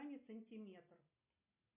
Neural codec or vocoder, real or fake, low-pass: none; real; 3.6 kHz